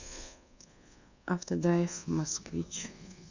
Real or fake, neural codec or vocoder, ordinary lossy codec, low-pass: fake; codec, 24 kHz, 1.2 kbps, DualCodec; none; 7.2 kHz